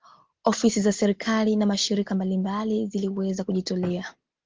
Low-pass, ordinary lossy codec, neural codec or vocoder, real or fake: 7.2 kHz; Opus, 16 kbps; none; real